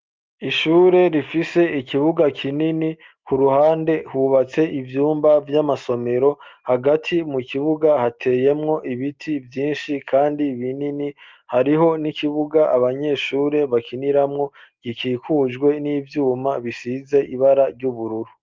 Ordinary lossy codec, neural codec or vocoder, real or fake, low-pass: Opus, 32 kbps; none; real; 7.2 kHz